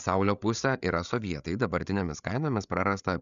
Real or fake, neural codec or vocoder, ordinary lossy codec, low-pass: fake; codec, 16 kHz, 8 kbps, FreqCodec, larger model; MP3, 96 kbps; 7.2 kHz